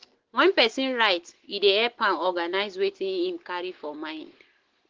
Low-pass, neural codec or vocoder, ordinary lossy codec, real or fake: 7.2 kHz; none; Opus, 16 kbps; real